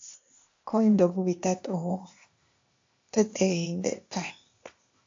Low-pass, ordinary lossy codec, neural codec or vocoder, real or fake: 7.2 kHz; AAC, 48 kbps; codec, 16 kHz, 0.8 kbps, ZipCodec; fake